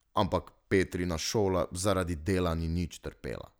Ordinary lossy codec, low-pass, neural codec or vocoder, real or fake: none; none; none; real